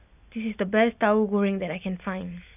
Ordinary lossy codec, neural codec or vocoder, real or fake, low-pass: none; none; real; 3.6 kHz